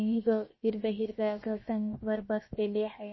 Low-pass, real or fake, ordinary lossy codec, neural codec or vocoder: 7.2 kHz; fake; MP3, 24 kbps; codec, 16 kHz, 0.7 kbps, FocalCodec